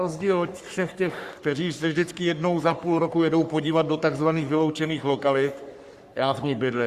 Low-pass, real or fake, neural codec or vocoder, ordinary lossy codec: 14.4 kHz; fake; codec, 44.1 kHz, 3.4 kbps, Pupu-Codec; Opus, 64 kbps